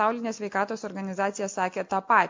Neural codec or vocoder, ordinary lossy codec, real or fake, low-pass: none; MP3, 48 kbps; real; 7.2 kHz